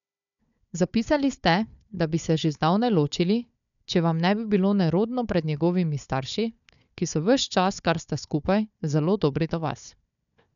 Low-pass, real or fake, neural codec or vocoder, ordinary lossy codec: 7.2 kHz; fake; codec, 16 kHz, 4 kbps, FunCodec, trained on Chinese and English, 50 frames a second; none